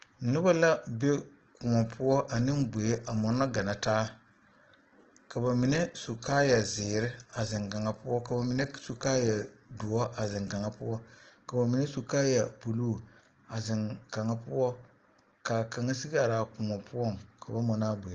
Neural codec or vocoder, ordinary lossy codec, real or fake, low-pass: none; Opus, 16 kbps; real; 7.2 kHz